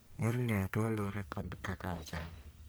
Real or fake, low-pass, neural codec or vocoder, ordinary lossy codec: fake; none; codec, 44.1 kHz, 1.7 kbps, Pupu-Codec; none